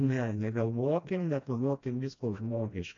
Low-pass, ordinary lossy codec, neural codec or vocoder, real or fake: 7.2 kHz; AAC, 48 kbps; codec, 16 kHz, 1 kbps, FreqCodec, smaller model; fake